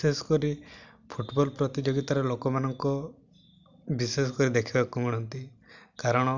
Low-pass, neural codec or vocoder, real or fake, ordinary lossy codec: 7.2 kHz; none; real; Opus, 64 kbps